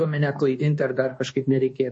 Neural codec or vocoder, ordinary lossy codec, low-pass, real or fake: codec, 24 kHz, 1.2 kbps, DualCodec; MP3, 32 kbps; 10.8 kHz; fake